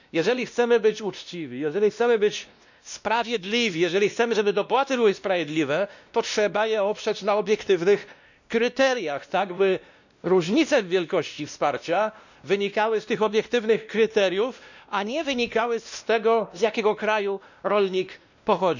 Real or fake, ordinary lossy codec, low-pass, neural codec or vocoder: fake; none; 7.2 kHz; codec, 16 kHz, 1 kbps, X-Codec, WavLM features, trained on Multilingual LibriSpeech